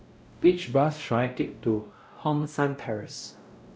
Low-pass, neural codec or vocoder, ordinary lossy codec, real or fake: none; codec, 16 kHz, 0.5 kbps, X-Codec, WavLM features, trained on Multilingual LibriSpeech; none; fake